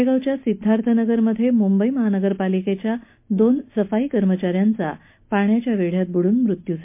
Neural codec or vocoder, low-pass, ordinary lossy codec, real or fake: none; 3.6 kHz; MP3, 24 kbps; real